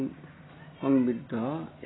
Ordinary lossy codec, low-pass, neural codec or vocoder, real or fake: AAC, 16 kbps; 7.2 kHz; none; real